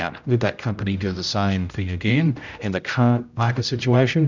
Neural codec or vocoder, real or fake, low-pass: codec, 16 kHz, 0.5 kbps, X-Codec, HuBERT features, trained on general audio; fake; 7.2 kHz